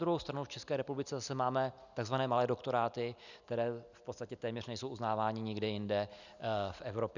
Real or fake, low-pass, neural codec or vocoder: real; 7.2 kHz; none